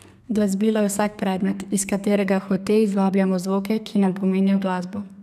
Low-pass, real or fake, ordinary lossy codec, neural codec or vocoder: 14.4 kHz; fake; none; codec, 32 kHz, 1.9 kbps, SNAC